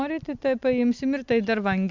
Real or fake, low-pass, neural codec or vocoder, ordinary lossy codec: real; 7.2 kHz; none; MP3, 64 kbps